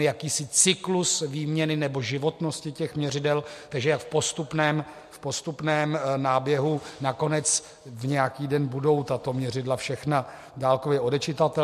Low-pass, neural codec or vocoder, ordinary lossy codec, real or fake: 14.4 kHz; none; MP3, 64 kbps; real